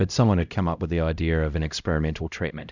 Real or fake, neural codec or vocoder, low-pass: fake; codec, 16 kHz, 0.5 kbps, X-Codec, HuBERT features, trained on LibriSpeech; 7.2 kHz